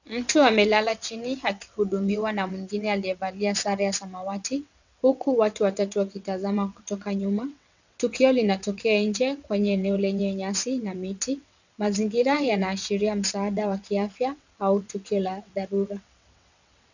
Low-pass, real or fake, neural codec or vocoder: 7.2 kHz; fake; vocoder, 22.05 kHz, 80 mel bands, WaveNeXt